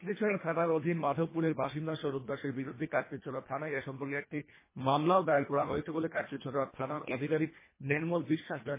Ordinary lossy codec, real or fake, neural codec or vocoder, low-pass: MP3, 16 kbps; fake; codec, 24 kHz, 1.5 kbps, HILCodec; 3.6 kHz